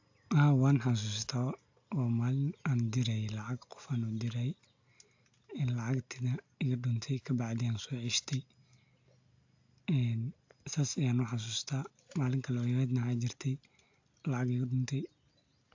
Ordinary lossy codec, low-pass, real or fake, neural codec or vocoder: AAC, 48 kbps; 7.2 kHz; real; none